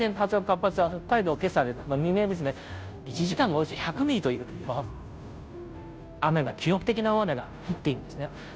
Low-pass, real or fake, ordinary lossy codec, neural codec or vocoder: none; fake; none; codec, 16 kHz, 0.5 kbps, FunCodec, trained on Chinese and English, 25 frames a second